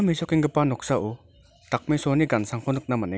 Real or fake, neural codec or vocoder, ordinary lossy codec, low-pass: real; none; none; none